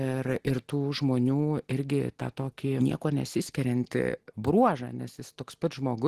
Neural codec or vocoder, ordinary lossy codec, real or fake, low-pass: none; Opus, 16 kbps; real; 14.4 kHz